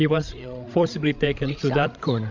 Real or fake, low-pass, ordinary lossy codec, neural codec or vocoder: fake; 7.2 kHz; MP3, 64 kbps; codec, 16 kHz, 16 kbps, FreqCodec, larger model